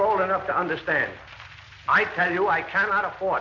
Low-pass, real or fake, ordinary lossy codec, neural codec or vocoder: 7.2 kHz; real; AAC, 48 kbps; none